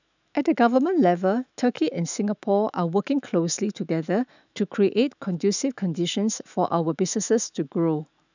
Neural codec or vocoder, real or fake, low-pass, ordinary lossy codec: autoencoder, 48 kHz, 128 numbers a frame, DAC-VAE, trained on Japanese speech; fake; 7.2 kHz; none